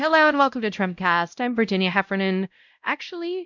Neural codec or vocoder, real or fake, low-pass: codec, 16 kHz, 0.5 kbps, X-Codec, WavLM features, trained on Multilingual LibriSpeech; fake; 7.2 kHz